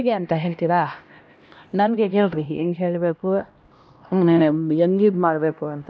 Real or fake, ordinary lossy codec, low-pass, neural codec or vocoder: fake; none; none; codec, 16 kHz, 1 kbps, X-Codec, HuBERT features, trained on LibriSpeech